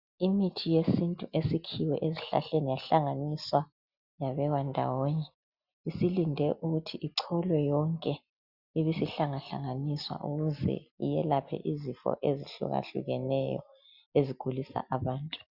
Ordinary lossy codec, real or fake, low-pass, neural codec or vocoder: Opus, 64 kbps; real; 5.4 kHz; none